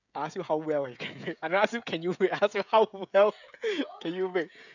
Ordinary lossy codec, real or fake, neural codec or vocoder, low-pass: none; fake; codec, 16 kHz, 16 kbps, FreqCodec, smaller model; 7.2 kHz